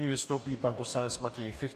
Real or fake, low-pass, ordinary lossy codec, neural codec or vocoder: fake; 14.4 kHz; AAC, 64 kbps; codec, 44.1 kHz, 2.6 kbps, DAC